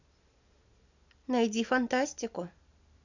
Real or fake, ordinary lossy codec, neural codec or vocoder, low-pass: real; none; none; 7.2 kHz